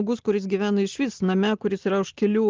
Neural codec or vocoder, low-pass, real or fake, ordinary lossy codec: none; 7.2 kHz; real; Opus, 16 kbps